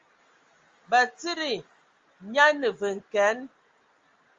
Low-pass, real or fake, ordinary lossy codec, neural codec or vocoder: 7.2 kHz; real; Opus, 32 kbps; none